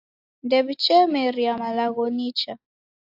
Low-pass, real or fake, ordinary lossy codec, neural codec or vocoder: 5.4 kHz; real; AAC, 32 kbps; none